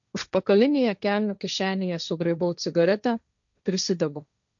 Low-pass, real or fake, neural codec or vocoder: 7.2 kHz; fake; codec, 16 kHz, 1.1 kbps, Voila-Tokenizer